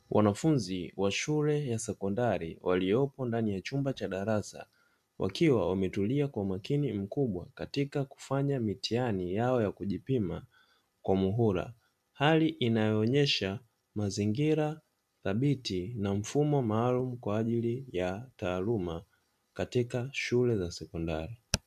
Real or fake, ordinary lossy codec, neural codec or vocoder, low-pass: real; MP3, 96 kbps; none; 14.4 kHz